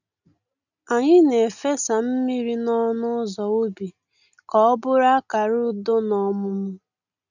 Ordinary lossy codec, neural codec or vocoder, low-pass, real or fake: none; none; 7.2 kHz; real